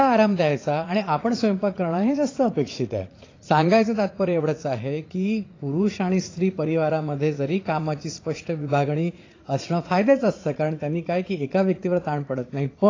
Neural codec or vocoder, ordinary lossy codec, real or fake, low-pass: vocoder, 44.1 kHz, 80 mel bands, Vocos; AAC, 32 kbps; fake; 7.2 kHz